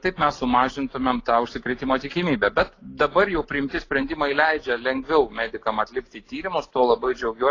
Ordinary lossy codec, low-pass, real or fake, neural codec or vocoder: AAC, 32 kbps; 7.2 kHz; real; none